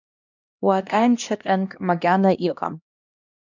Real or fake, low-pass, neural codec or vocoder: fake; 7.2 kHz; codec, 16 kHz, 1 kbps, X-Codec, HuBERT features, trained on LibriSpeech